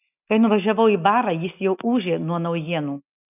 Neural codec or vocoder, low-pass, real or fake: none; 3.6 kHz; real